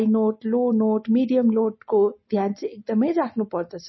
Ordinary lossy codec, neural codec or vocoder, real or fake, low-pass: MP3, 24 kbps; none; real; 7.2 kHz